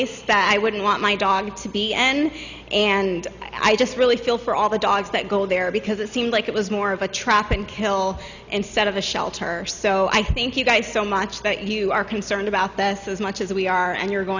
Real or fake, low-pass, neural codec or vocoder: real; 7.2 kHz; none